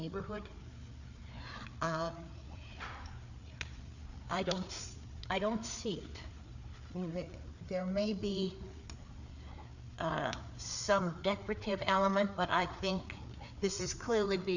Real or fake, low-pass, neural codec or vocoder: fake; 7.2 kHz; codec, 16 kHz, 4 kbps, FreqCodec, larger model